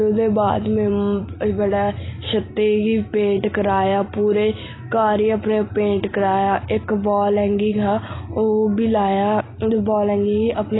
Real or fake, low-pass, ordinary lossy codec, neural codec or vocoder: real; 7.2 kHz; AAC, 16 kbps; none